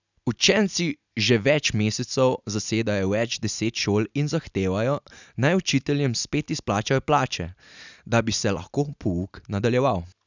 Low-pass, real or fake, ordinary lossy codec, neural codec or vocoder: 7.2 kHz; real; none; none